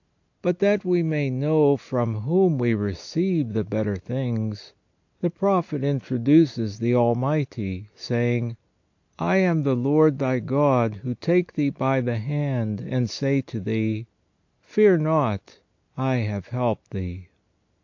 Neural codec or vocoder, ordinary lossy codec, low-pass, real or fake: none; AAC, 48 kbps; 7.2 kHz; real